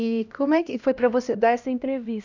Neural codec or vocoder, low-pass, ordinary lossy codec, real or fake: codec, 16 kHz, 1 kbps, X-Codec, HuBERT features, trained on LibriSpeech; 7.2 kHz; none; fake